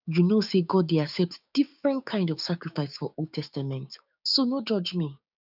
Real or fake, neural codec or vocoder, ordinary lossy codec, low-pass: fake; codec, 16 kHz, 6 kbps, DAC; none; 5.4 kHz